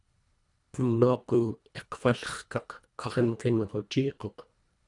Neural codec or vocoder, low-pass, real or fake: codec, 24 kHz, 1.5 kbps, HILCodec; 10.8 kHz; fake